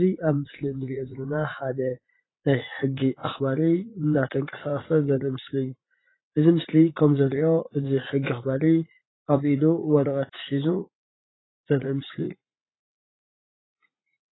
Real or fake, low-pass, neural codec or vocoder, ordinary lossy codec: fake; 7.2 kHz; vocoder, 44.1 kHz, 80 mel bands, Vocos; AAC, 16 kbps